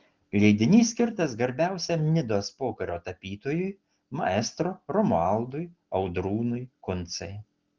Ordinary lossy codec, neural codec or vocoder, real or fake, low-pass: Opus, 16 kbps; none; real; 7.2 kHz